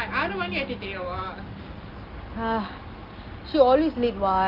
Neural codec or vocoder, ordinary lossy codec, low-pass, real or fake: none; Opus, 32 kbps; 5.4 kHz; real